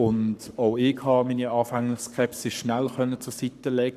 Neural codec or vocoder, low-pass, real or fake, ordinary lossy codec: codec, 44.1 kHz, 7.8 kbps, Pupu-Codec; 14.4 kHz; fake; none